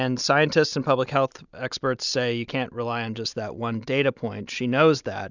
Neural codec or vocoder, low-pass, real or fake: none; 7.2 kHz; real